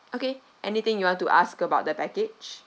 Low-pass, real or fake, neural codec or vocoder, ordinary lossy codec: none; real; none; none